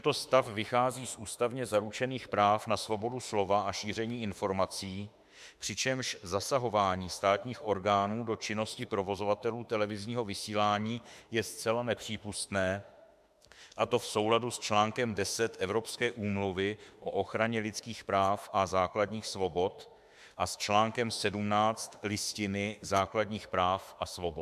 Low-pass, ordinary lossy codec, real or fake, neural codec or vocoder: 14.4 kHz; MP3, 96 kbps; fake; autoencoder, 48 kHz, 32 numbers a frame, DAC-VAE, trained on Japanese speech